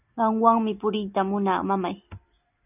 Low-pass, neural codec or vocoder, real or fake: 3.6 kHz; none; real